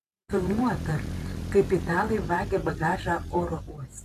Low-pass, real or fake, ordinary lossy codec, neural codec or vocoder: 14.4 kHz; fake; Opus, 64 kbps; vocoder, 44.1 kHz, 128 mel bands every 512 samples, BigVGAN v2